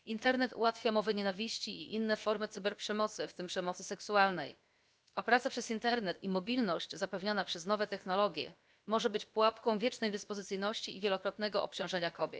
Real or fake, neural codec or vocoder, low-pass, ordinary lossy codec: fake; codec, 16 kHz, about 1 kbps, DyCAST, with the encoder's durations; none; none